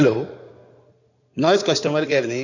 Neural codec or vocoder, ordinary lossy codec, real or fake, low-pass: codec, 16 kHz in and 24 kHz out, 2.2 kbps, FireRedTTS-2 codec; none; fake; 7.2 kHz